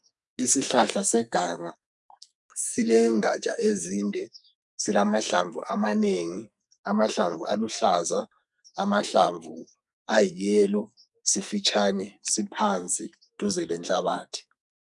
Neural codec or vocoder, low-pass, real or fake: codec, 44.1 kHz, 2.6 kbps, SNAC; 10.8 kHz; fake